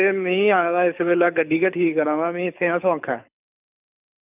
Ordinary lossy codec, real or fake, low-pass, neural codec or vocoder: none; fake; 3.6 kHz; codec, 24 kHz, 6 kbps, HILCodec